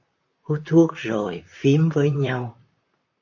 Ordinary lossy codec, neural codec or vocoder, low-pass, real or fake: AAC, 48 kbps; vocoder, 44.1 kHz, 128 mel bands, Pupu-Vocoder; 7.2 kHz; fake